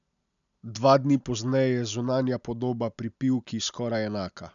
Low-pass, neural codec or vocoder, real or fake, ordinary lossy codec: 7.2 kHz; none; real; none